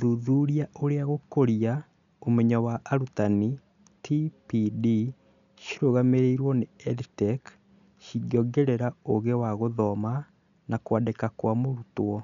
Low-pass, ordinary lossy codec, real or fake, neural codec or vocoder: 7.2 kHz; none; real; none